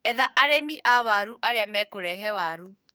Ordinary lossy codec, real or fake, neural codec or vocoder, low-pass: none; fake; codec, 44.1 kHz, 2.6 kbps, SNAC; none